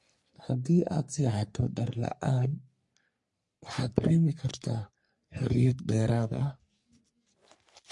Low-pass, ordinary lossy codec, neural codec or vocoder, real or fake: 10.8 kHz; MP3, 48 kbps; codec, 44.1 kHz, 3.4 kbps, Pupu-Codec; fake